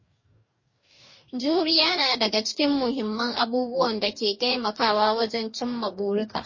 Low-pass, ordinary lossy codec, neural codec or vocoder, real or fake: 7.2 kHz; MP3, 32 kbps; codec, 44.1 kHz, 2.6 kbps, DAC; fake